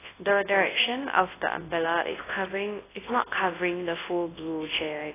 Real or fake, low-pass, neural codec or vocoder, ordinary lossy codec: fake; 3.6 kHz; codec, 24 kHz, 0.9 kbps, WavTokenizer, large speech release; AAC, 16 kbps